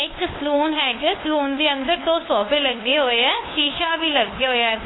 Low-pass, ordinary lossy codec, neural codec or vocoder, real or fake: 7.2 kHz; AAC, 16 kbps; codec, 16 kHz, 2 kbps, FunCodec, trained on LibriTTS, 25 frames a second; fake